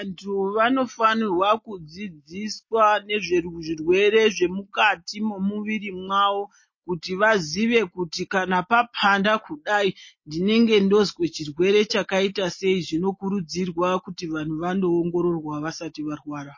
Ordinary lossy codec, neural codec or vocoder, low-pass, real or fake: MP3, 32 kbps; none; 7.2 kHz; real